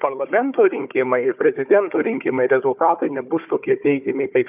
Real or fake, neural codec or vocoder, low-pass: fake; codec, 16 kHz, 4 kbps, FunCodec, trained on Chinese and English, 50 frames a second; 3.6 kHz